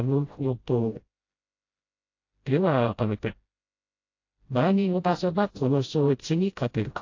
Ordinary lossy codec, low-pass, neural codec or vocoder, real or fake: AAC, 48 kbps; 7.2 kHz; codec, 16 kHz, 0.5 kbps, FreqCodec, smaller model; fake